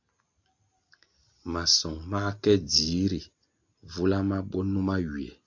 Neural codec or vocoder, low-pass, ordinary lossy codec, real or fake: none; 7.2 kHz; AAC, 48 kbps; real